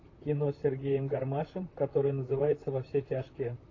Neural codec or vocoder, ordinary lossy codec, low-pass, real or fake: vocoder, 44.1 kHz, 128 mel bands, Pupu-Vocoder; AAC, 32 kbps; 7.2 kHz; fake